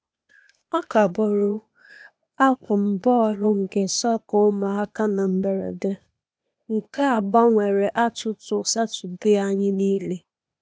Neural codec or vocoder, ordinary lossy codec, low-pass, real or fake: codec, 16 kHz, 0.8 kbps, ZipCodec; none; none; fake